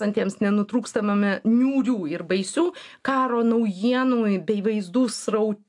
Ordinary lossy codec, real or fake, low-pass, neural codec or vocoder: AAC, 64 kbps; real; 10.8 kHz; none